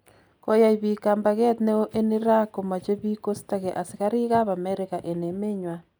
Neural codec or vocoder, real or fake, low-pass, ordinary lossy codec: none; real; none; none